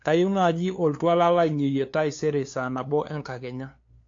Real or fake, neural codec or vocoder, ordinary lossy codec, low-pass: fake; codec, 16 kHz, 2 kbps, FunCodec, trained on LibriTTS, 25 frames a second; AAC, 48 kbps; 7.2 kHz